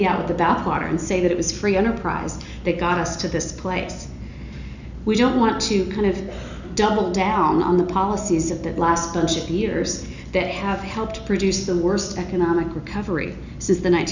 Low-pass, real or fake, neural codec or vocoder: 7.2 kHz; real; none